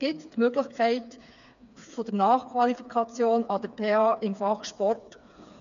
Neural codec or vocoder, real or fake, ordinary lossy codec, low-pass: codec, 16 kHz, 4 kbps, FreqCodec, smaller model; fake; MP3, 96 kbps; 7.2 kHz